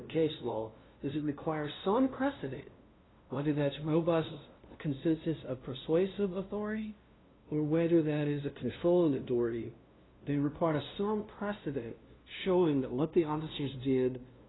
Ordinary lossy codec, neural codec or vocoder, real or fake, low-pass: AAC, 16 kbps; codec, 16 kHz, 0.5 kbps, FunCodec, trained on LibriTTS, 25 frames a second; fake; 7.2 kHz